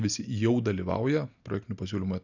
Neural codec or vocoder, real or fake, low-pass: none; real; 7.2 kHz